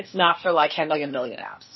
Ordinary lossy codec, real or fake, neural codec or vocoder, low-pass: MP3, 24 kbps; fake; codec, 16 kHz, 0.8 kbps, ZipCodec; 7.2 kHz